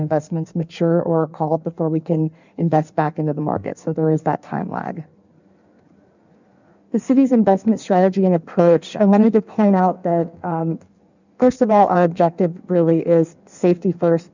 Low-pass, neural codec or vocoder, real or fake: 7.2 kHz; codec, 16 kHz in and 24 kHz out, 1.1 kbps, FireRedTTS-2 codec; fake